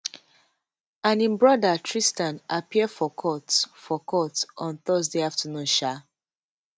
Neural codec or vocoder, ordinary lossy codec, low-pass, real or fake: none; none; none; real